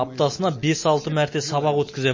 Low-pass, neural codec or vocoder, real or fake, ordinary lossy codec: 7.2 kHz; none; real; MP3, 32 kbps